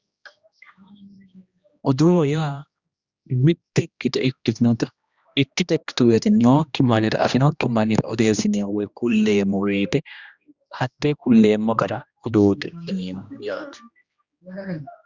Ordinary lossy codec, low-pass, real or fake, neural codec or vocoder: Opus, 64 kbps; 7.2 kHz; fake; codec, 16 kHz, 1 kbps, X-Codec, HuBERT features, trained on general audio